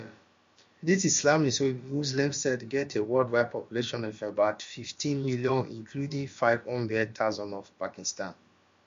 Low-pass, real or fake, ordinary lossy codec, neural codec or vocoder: 7.2 kHz; fake; MP3, 48 kbps; codec, 16 kHz, about 1 kbps, DyCAST, with the encoder's durations